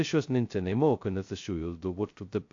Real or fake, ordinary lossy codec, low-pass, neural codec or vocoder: fake; AAC, 48 kbps; 7.2 kHz; codec, 16 kHz, 0.2 kbps, FocalCodec